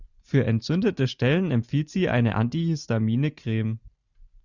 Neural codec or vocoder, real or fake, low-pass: none; real; 7.2 kHz